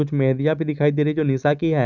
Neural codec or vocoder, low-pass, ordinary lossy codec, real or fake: none; 7.2 kHz; none; real